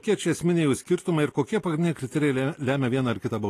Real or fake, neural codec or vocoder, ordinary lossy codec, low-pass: real; none; AAC, 48 kbps; 14.4 kHz